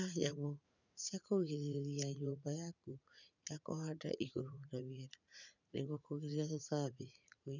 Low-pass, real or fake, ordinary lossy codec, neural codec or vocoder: 7.2 kHz; fake; none; vocoder, 44.1 kHz, 80 mel bands, Vocos